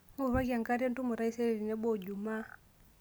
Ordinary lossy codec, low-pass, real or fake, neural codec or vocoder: none; none; real; none